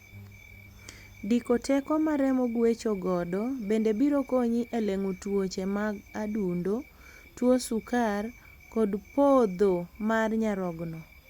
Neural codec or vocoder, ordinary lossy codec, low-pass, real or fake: none; Opus, 64 kbps; 19.8 kHz; real